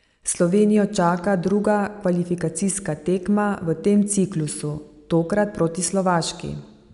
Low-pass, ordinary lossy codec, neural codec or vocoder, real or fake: 10.8 kHz; none; none; real